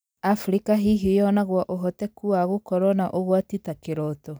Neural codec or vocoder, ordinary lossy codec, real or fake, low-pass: none; none; real; none